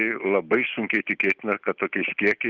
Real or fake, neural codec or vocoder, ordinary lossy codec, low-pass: real; none; Opus, 32 kbps; 7.2 kHz